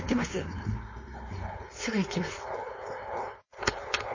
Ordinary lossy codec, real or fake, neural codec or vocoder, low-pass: MP3, 32 kbps; fake; codec, 16 kHz, 4.8 kbps, FACodec; 7.2 kHz